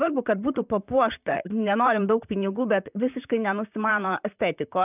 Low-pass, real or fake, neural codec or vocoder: 3.6 kHz; fake; vocoder, 44.1 kHz, 128 mel bands, Pupu-Vocoder